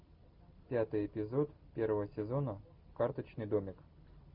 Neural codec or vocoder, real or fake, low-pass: none; real; 5.4 kHz